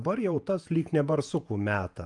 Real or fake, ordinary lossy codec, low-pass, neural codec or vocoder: fake; Opus, 32 kbps; 10.8 kHz; vocoder, 48 kHz, 128 mel bands, Vocos